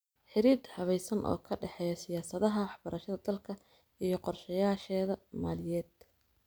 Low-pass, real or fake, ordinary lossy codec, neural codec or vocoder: none; real; none; none